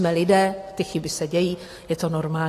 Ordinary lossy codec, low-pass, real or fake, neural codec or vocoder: AAC, 48 kbps; 14.4 kHz; real; none